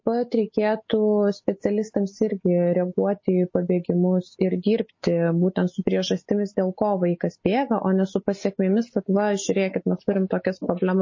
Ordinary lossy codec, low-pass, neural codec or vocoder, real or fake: MP3, 32 kbps; 7.2 kHz; none; real